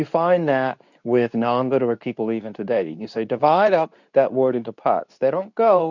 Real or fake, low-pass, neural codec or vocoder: fake; 7.2 kHz; codec, 24 kHz, 0.9 kbps, WavTokenizer, medium speech release version 2